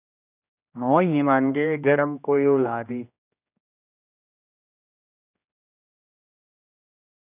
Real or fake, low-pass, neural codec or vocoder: fake; 3.6 kHz; codec, 16 kHz, 1 kbps, X-Codec, HuBERT features, trained on general audio